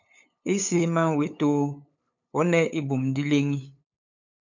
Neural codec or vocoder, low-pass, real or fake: codec, 16 kHz, 8 kbps, FunCodec, trained on LibriTTS, 25 frames a second; 7.2 kHz; fake